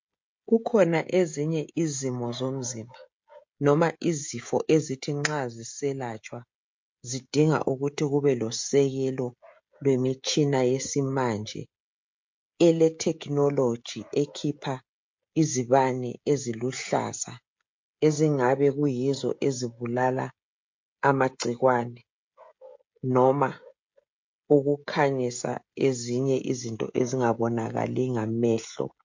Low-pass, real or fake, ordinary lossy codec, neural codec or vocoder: 7.2 kHz; fake; MP3, 48 kbps; codec, 16 kHz, 16 kbps, FreqCodec, smaller model